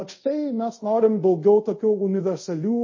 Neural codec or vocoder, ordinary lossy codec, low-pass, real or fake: codec, 24 kHz, 0.5 kbps, DualCodec; MP3, 32 kbps; 7.2 kHz; fake